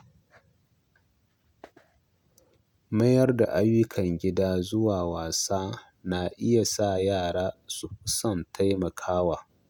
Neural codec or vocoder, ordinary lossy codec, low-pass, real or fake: none; none; 19.8 kHz; real